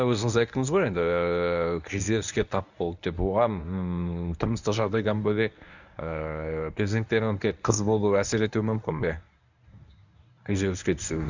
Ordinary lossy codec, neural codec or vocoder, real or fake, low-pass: none; codec, 24 kHz, 0.9 kbps, WavTokenizer, medium speech release version 1; fake; 7.2 kHz